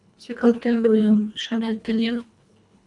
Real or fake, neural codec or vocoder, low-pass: fake; codec, 24 kHz, 1.5 kbps, HILCodec; 10.8 kHz